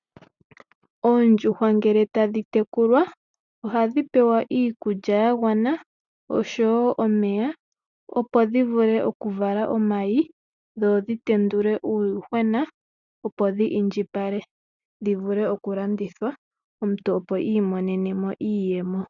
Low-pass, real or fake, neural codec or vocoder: 7.2 kHz; real; none